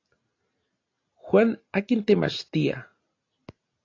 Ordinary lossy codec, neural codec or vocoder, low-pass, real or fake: AAC, 32 kbps; none; 7.2 kHz; real